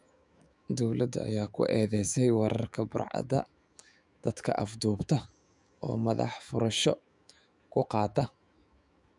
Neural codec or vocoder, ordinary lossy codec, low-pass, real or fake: autoencoder, 48 kHz, 128 numbers a frame, DAC-VAE, trained on Japanese speech; none; 10.8 kHz; fake